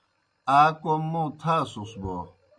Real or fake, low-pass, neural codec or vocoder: real; 9.9 kHz; none